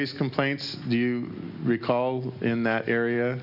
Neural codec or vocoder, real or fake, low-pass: none; real; 5.4 kHz